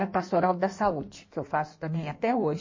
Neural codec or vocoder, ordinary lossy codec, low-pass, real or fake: codec, 16 kHz in and 24 kHz out, 1.1 kbps, FireRedTTS-2 codec; MP3, 32 kbps; 7.2 kHz; fake